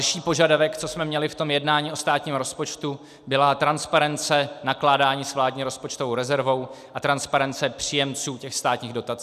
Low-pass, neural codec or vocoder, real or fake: 14.4 kHz; none; real